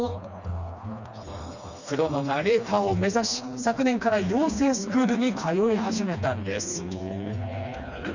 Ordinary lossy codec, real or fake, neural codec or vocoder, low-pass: none; fake; codec, 16 kHz, 2 kbps, FreqCodec, smaller model; 7.2 kHz